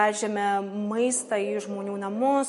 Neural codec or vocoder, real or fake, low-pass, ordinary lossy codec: none; real; 14.4 kHz; MP3, 48 kbps